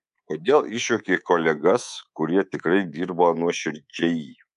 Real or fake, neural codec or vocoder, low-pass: fake; codec, 24 kHz, 3.1 kbps, DualCodec; 10.8 kHz